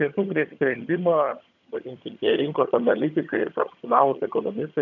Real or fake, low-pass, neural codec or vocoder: fake; 7.2 kHz; vocoder, 22.05 kHz, 80 mel bands, HiFi-GAN